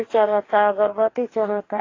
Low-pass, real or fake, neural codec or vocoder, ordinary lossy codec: 7.2 kHz; fake; codec, 44.1 kHz, 2.6 kbps, DAC; AAC, 32 kbps